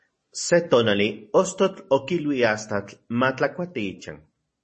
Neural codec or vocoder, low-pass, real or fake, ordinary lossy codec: none; 10.8 kHz; real; MP3, 32 kbps